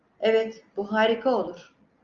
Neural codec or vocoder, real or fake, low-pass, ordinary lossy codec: none; real; 7.2 kHz; Opus, 32 kbps